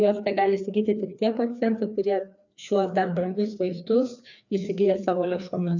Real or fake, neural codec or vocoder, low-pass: fake; codec, 16 kHz, 2 kbps, FreqCodec, larger model; 7.2 kHz